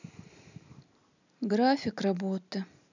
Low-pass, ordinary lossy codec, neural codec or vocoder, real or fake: 7.2 kHz; none; none; real